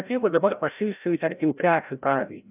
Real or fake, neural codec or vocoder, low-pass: fake; codec, 16 kHz, 0.5 kbps, FreqCodec, larger model; 3.6 kHz